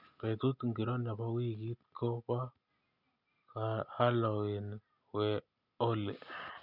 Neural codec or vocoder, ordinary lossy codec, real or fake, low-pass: none; none; real; 5.4 kHz